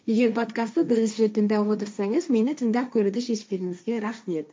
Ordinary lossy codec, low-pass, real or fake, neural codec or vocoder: none; none; fake; codec, 16 kHz, 1.1 kbps, Voila-Tokenizer